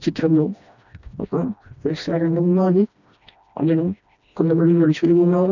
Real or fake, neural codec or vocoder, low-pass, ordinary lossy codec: fake; codec, 16 kHz, 1 kbps, FreqCodec, smaller model; 7.2 kHz; none